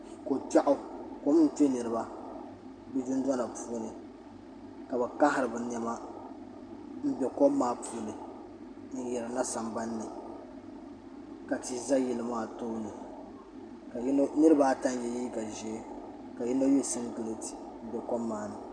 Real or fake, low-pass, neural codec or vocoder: real; 9.9 kHz; none